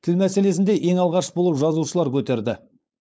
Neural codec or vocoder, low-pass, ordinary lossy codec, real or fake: codec, 16 kHz, 4.8 kbps, FACodec; none; none; fake